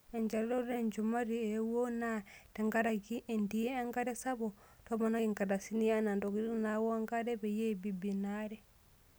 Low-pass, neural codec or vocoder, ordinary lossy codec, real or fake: none; none; none; real